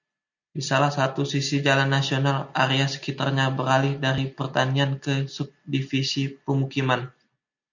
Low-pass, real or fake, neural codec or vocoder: 7.2 kHz; real; none